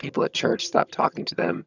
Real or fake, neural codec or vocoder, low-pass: fake; vocoder, 22.05 kHz, 80 mel bands, HiFi-GAN; 7.2 kHz